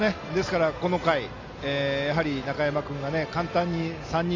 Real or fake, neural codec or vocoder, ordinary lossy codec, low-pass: real; none; AAC, 32 kbps; 7.2 kHz